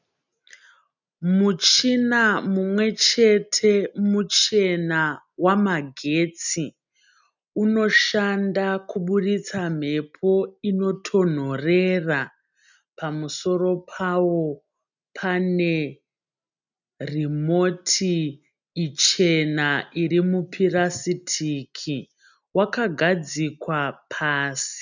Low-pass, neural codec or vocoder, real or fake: 7.2 kHz; none; real